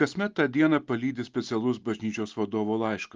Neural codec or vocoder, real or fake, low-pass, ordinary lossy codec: none; real; 7.2 kHz; Opus, 24 kbps